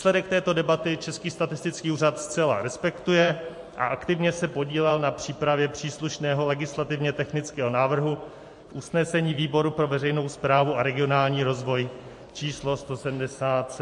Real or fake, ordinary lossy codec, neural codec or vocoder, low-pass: fake; MP3, 48 kbps; vocoder, 44.1 kHz, 128 mel bands every 512 samples, BigVGAN v2; 10.8 kHz